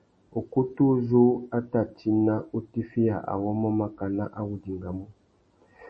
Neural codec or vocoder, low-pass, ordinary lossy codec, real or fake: none; 9.9 kHz; MP3, 32 kbps; real